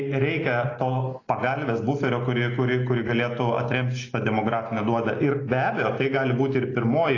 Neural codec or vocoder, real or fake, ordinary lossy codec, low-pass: none; real; AAC, 32 kbps; 7.2 kHz